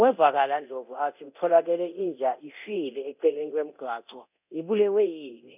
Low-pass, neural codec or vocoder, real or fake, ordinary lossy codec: 3.6 kHz; codec, 24 kHz, 0.9 kbps, DualCodec; fake; none